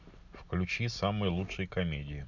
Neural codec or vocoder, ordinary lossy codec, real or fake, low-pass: none; none; real; 7.2 kHz